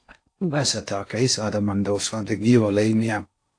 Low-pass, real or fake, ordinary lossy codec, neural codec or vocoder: 9.9 kHz; fake; AAC, 48 kbps; codec, 16 kHz in and 24 kHz out, 0.6 kbps, FocalCodec, streaming, 4096 codes